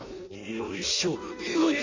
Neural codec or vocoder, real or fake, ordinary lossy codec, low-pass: codec, 16 kHz in and 24 kHz out, 0.6 kbps, FireRedTTS-2 codec; fake; AAC, 48 kbps; 7.2 kHz